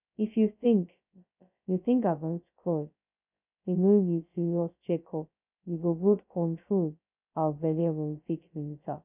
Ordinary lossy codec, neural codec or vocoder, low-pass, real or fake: none; codec, 16 kHz, 0.2 kbps, FocalCodec; 3.6 kHz; fake